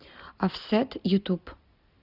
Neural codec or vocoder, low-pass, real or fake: none; 5.4 kHz; real